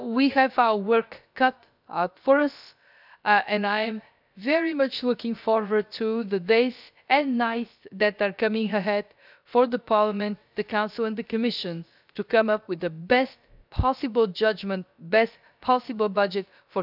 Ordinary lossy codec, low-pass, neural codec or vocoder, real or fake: none; 5.4 kHz; codec, 16 kHz, about 1 kbps, DyCAST, with the encoder's durations; fake